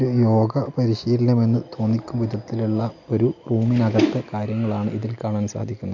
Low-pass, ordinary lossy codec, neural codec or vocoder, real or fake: 7.2 kHz; none; none; real